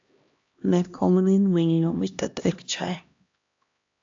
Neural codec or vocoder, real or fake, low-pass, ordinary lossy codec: codec, 16 kHz, 1 kbps, X-Codec, HuBERT features, trained on LibriSpeech; fake; 7.2 kHz; AAC, 64 kbps